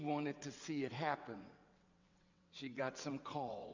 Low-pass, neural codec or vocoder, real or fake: 7.2 kHz; vocoder, 22.05 kHz, 80 mel bands, WaveNeXt; fake